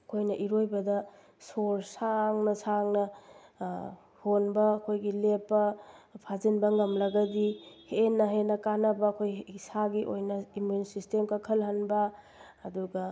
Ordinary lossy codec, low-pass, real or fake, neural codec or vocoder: none; none; real; none